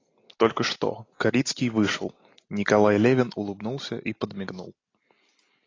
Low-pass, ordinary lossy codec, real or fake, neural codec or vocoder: 7.2 kHz; AAC, 32 kbps; real; none